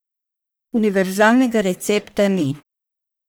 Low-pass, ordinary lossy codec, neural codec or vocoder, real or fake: none; none; codec, 44.1 kHz, 1.7 kbps, Pupu-Codec; fake